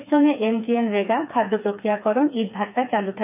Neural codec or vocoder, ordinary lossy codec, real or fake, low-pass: codec, 16 kHz, 4 kbps, FreqCodec, smaller model; none; fake; 3.6 kHz